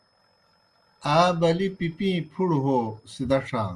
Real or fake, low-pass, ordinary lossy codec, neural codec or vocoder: real; 10.8 kHz; Opus, 32 kbps; none